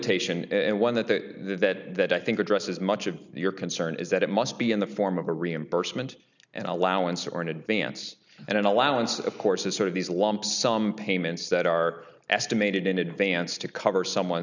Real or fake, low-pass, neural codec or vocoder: real; 7.2 kHz; none